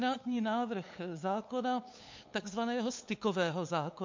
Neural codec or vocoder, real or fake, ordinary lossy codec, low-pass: codec, 16 kHz, 8 kbps, FunCodec, trained on LibriTTS, 25 frames a second; fake; MP3, 48 kbps; 7.2 kHz